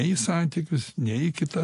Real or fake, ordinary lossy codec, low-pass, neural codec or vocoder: real; AAC, 48 kbps; 10.8 kHz; none